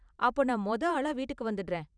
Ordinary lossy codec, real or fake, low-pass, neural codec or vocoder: none; fake; 9.9 kHz; vocoder, 44.1 kHz, 128 mel bands every 512 samples, BigVGAN v2